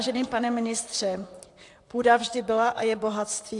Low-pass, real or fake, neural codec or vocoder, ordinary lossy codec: 10.8 kHz; fake; vocoder, 44.1 kHz, 128 mel bands, Pupu-Vocoder; AAC, 64 kbps